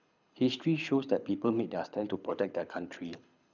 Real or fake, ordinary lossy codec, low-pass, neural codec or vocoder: fake; none; 7.2 kHz; codec, 24 kHz, 6 kbps, HILCodec